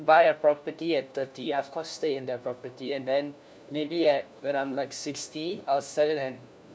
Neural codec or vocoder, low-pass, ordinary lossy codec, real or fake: codec, 16 kHz, 1 kbps, FunCodec, trained on LibriTTS, 50 frames a second; none; none; fake